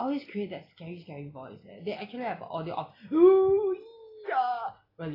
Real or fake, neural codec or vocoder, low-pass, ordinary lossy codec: real; none; 5.4 kHz; AAC, 24 kbps